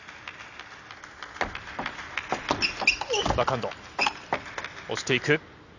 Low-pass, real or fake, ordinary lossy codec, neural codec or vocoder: 7.2 kHz; real; none; none